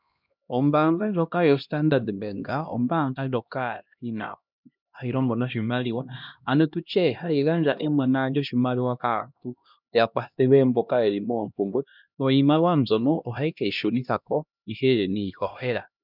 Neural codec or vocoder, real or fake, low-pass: codec, 16 kHz, 1 kbps, X-Codec, HuBERT features, trained on LibriSpeech; fake; 5.4 kHz